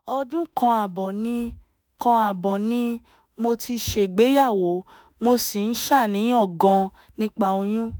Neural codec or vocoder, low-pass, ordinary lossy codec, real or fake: autoencoder, 48 kHz, 32 numbers a frame, DAC-VAE, trained on Japanese speech; none; none; fake